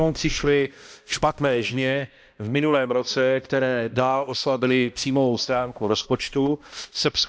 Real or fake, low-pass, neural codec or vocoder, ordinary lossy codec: fake; none; codec, 16 kHz, 1 kbps, X-Codec, HuBERT features, trained on balanced general audio; none